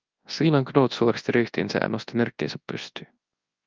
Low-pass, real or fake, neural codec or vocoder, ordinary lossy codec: 7.2 kHz; fake; codec, 24 kHz, 0.9 kbps, WavTokenizer, large speech release; Opus, 32 kbps